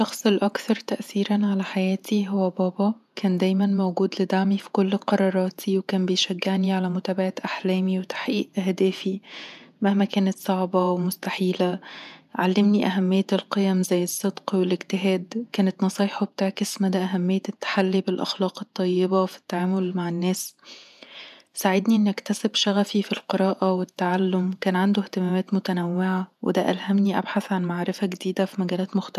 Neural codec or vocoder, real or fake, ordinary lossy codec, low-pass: vocoder, 48 kHz, 128 mel bands, Vocos; fake; none; 14.4 kHz